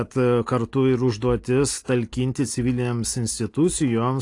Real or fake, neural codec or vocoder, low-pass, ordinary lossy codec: real; none; 10.8 kHz; AAC, 48 kbps